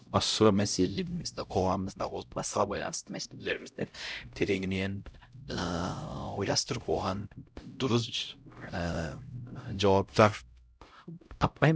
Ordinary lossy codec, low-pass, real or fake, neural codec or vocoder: none; none; fake; codec, 16 kHz, 0.5 kbps, X-Codec, HuBERT features, trained on LibriSpeech